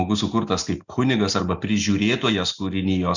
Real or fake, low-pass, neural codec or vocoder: real; 7.2 kHz; none